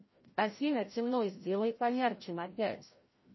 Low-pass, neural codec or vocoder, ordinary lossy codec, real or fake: 7.2 kHz; codec, 16 kHz, 0.5 kbps, FreqCodec, larger model; MP3, 24 kbps; fake